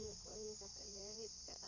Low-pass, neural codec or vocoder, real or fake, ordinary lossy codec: 7.2 kHz; codec, 16 kHz in and 24 kHz out, 2.2 kbps, FireRedTTS-2 codec; fake; none